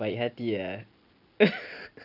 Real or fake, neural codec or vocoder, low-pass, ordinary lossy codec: real; none; 5.4 kHz; none